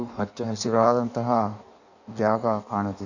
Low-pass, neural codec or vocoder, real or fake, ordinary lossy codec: 7.2 kHz; codec, 16 kHz in and 24 kHz out, 1.1 kbps, FireRedTTS-2 codec; fake; none